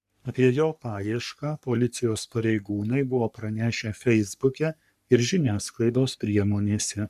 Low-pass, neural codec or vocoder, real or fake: 14.4 kHz; codec, 44.1 kHz, 3.4 kbps, Pupu-Codec; fake